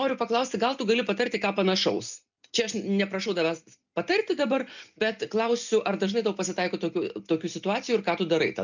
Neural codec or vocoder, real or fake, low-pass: none; real; 7.2 kHz